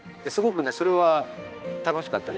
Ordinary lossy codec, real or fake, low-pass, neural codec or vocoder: none; fake; none; codec, 16 kHz, 2 kbps, X-Codec, HuBERT features, trained on balanced general audio